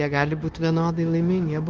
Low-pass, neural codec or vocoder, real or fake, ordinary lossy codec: 7.2 kHz; codec, 16 kHz, 0.9 kbps, LongCat-Audio-Codec; fake; Opus, 16 kbps